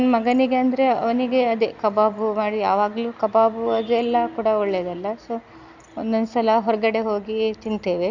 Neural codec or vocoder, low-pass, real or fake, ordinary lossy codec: none; 7.2 kHz; real; Opus, 64 kbps